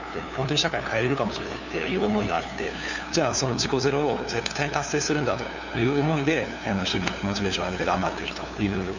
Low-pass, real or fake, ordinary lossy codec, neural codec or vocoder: 7.2 kHz; fake; none; codec, 16 kHz, 2 kbps, FunCodec, trained on LibriTTS, 25 frames a second